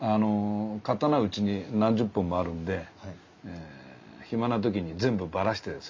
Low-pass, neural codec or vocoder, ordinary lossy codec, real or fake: 7.2 kHz; none; MP3, 64 kbps; real